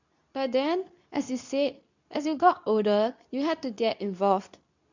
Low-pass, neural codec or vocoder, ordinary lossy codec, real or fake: 7.2 kHz; codec, 24 kHz, 0.9 kbps, WavTokenizer, medium speech release version 2; none; fake